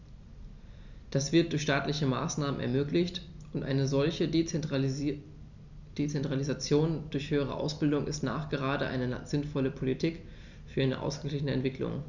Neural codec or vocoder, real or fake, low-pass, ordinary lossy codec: none; real; 7.2 kHz; none